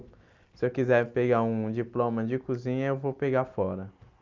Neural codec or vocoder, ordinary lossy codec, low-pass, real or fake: none; Opus, 24 kbps; 7.2 kHz; real